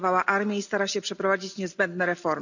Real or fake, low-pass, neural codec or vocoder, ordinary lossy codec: real; 7.2 kHz; none; none